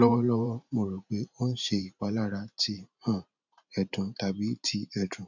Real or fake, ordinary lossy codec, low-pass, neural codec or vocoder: fake; none; 7.2 kHz; vocoder, 44.1 kHz, 128 mel bands every 512 samples, BigVGAN v2